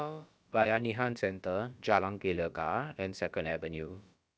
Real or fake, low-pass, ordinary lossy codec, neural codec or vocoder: fake; none; none; codec, 16 kHz, about 1 kbps, DyCAST, with the encoder's durations